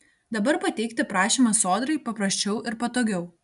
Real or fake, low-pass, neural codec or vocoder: real; 10.8 kHz; none